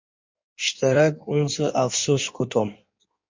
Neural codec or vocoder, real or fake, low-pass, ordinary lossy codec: codec, 16 kHz in and 24 kHz out, 1.1 kbps, FireRedTTS-2 codec; fake; 7.2 kHz; MP3, 48 kbps